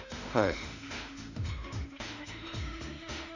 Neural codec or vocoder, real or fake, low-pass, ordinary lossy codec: autoencoder, 48 kHz, 32 numbers a frame, DAC-VAE, trained on Japanese speech; fake; 7.2 kHz; none